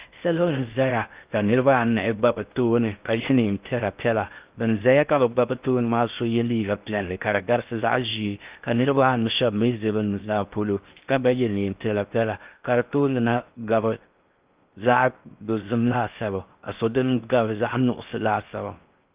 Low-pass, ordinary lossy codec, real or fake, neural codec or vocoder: 3.6 kHz; Opus, 24 kbps; fake; codec, 16 kHz in and 24 kHz out, 0.6 kbps, FocalCodec, streaming, 2048 codes